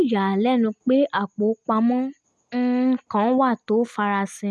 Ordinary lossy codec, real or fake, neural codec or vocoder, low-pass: none; real; none; none